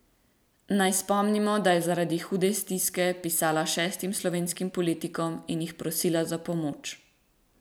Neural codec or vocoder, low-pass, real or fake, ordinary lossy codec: vocoder, 44.1 kHz, 128 mel bands every 256 samples, BigVGAN v2; none; fake; none